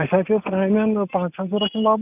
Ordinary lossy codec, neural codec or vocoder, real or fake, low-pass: none; none; real; 3.6 kHz